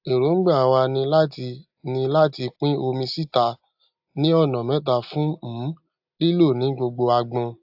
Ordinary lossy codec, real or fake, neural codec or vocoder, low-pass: AAC, 48 kbps; real; none; 5.4 kHz